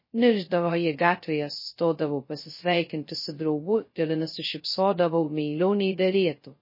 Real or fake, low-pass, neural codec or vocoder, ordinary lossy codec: fake; 5.4 kHz; codec, 16 kHz, 0.2 kbps, FocalCodec; MP3, 24 kbps